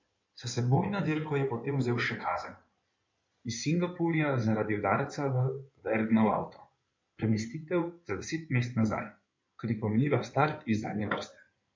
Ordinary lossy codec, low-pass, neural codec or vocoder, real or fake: MP3, 64 kbps; 7.2 kHz; codec, 16 kHz in and 24 kHz out, 2.2 kbps, FireRedTTS-2 codec; fake